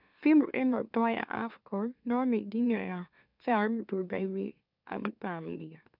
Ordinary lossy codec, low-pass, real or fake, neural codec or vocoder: none; 5.4 kHz; fake; autoencoder, 44.1 kHz, a latent of 192 numbers a frame, MeloTTS